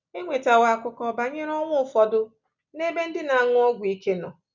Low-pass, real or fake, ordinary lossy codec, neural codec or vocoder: 7.2 kHz; real; none; none